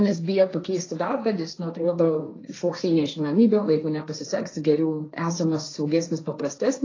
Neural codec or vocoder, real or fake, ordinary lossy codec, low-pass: codec, 16 kHz, 1.1 kbps, Voila-Tokenizer; fake; AAC, 32 kbps; 7.2 kHz